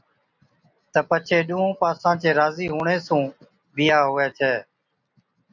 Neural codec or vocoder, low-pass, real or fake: none; 7.2 kHz; real